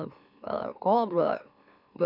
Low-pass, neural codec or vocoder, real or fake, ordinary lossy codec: 5.4 kHz; autoencoder, 44.1 kHz, a latent of 192 numbers a frame, MeloTTS; fake; none